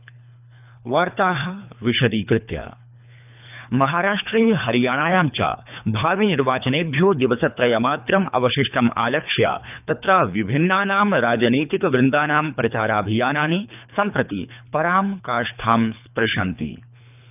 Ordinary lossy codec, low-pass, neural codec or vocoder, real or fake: none; 3.6 kHz; codec, 24 kHz, 3 kbps, HILCodec; fake